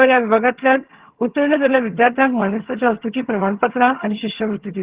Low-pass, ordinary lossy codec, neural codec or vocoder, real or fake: 3.6 kHz; Opus, 16 kbps; vocoder, 22.05 kHz, 80 mel bands, HiFi-GAN; fake